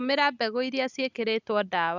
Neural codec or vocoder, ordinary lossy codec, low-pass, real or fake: none; none; 7.2 kHz; real